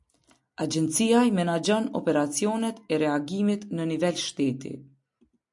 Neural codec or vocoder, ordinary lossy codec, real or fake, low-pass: none; MP3, 64 kbps; real; 10.8 kHz